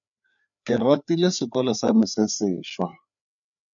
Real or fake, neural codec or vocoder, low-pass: fake; codec, 16 kHz, 4 kbps, FreqCodec, larger model; 7.2 kHz